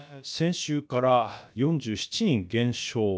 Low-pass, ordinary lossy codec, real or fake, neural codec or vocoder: none; none; fake; codec, 16 kHz, about 1 kbps, DyCAST, with the encoder's durations